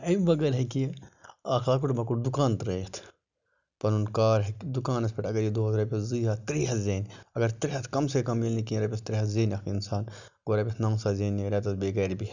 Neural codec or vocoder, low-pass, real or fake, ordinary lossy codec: none; 7.2 kHz; real; none